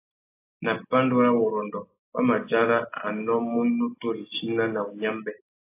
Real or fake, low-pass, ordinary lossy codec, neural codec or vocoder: real; 3.6 kHz; AAC, 24 kbps; none